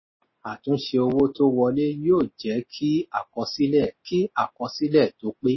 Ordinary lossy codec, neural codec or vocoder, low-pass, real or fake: MP3, 24 kbps; none; 7.2 kHz; real